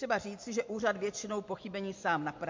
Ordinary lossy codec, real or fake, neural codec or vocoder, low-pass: MP3, 48 kbps; real; none; 7.2 kHz